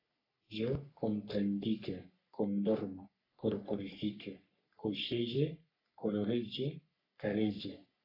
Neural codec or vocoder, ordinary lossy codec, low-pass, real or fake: codec, 44.1 kHz, 3.4 kbps, Pupu-Codec; AAC, 32 kbps; 5.4 kHz; fake